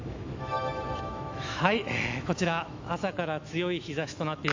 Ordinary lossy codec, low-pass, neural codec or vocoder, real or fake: AAC, 48 kbps; 7.2 kHz; autoencoder, 48 kHz, 128 numbers a frame, DAC-VAE, trained on Japanese speech; fake